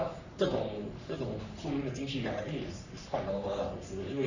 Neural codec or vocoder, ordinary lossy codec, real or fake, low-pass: codec, 44.1 kHz, 3.4 kbps, Pupu-Codec; none; fake; 7.2 kHz